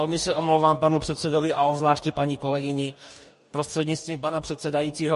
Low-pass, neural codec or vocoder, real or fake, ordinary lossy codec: 14.4 kHz; codec, 44.1 kHz, 2.6 kbps, DAC; fake; MP3, 48 kbps